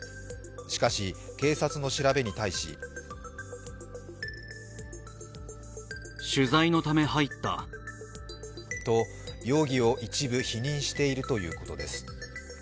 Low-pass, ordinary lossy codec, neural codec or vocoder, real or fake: none; none; none; real